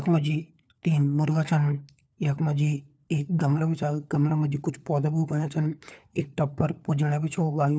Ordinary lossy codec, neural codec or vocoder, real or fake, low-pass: none; codec, 16 kHz, 4 kbps, FunCodec, trained on LibriTTS, 50 frames a second; fake; none